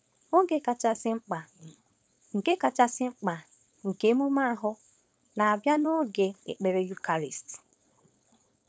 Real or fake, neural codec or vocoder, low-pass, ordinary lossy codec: fake; codec, 16 kHz, 4.8 kbps, FACodec; none; none